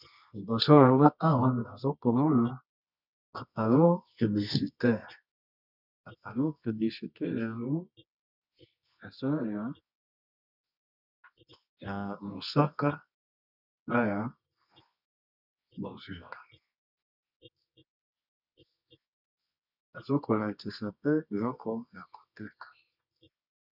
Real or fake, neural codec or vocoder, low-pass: fake; codec, 24 kHz, 0.9 kbps, WavTokenizer, medium music audio release; 5.4 kHz